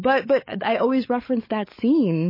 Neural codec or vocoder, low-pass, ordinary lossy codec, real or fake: codec, 16 kHz, 16 kbps, FunCodec, trained on LibriTTS, 50 frames a second; 5.4 kHz; MP3, 24 kbps; fake